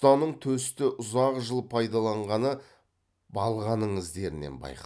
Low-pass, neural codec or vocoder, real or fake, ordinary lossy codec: none; none; real; none